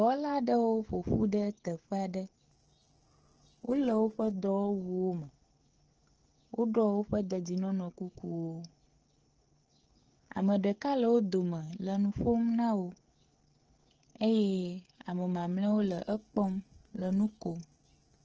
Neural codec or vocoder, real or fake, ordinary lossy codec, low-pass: codec, 16 kHz, 16 kbps, FreqCodec, smaller model; fake; Opus, 16 kbps; 7.2 kHz